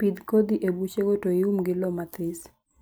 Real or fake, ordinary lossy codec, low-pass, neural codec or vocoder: real; none; none; none